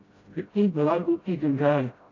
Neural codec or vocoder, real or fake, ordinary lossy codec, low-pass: codec, 16 kHz, 0.5 kbps, FreqCodec, smaller model; fake; AAC, 32 kbps; 7.2 kHz